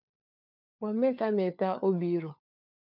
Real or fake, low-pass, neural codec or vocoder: fake; 5.4 kHz; codec, 16 kHz, 4 kbps, FunCodec, trained on LibriTTS, 50 frames a second